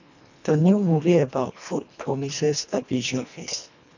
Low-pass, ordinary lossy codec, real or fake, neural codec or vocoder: 7.2 kHz; none; fake; codec, 24 kHz, 1.5 kbps, HILCodec